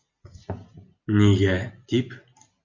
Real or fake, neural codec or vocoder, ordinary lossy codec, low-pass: real; none; Opus, 64 kbps; 7.2 kHz